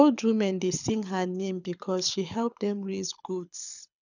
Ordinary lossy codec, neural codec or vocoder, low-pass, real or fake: none; codec, 24 kHz, 6 kbps, HILCodec; 7.2 kHz; fake